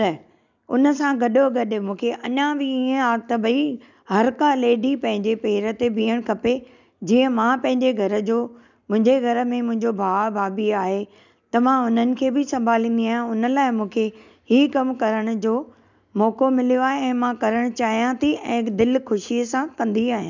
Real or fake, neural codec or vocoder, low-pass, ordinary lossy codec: real; none; 7.2 kHz; none